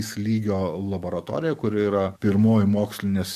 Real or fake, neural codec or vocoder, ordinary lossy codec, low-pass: fake; codec, 44.1 kHz, 7.8 kbps, DAC; MP3, 64 kbps; 14.4 kHz